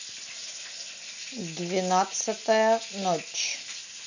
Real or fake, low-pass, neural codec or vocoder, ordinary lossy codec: real; 7.2 kHz; none; none